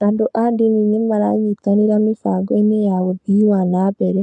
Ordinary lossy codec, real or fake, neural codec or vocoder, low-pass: none; fake; codec, 44.1 kHz, 7.8 kbps, Pupu-Codec; 10.8 kHz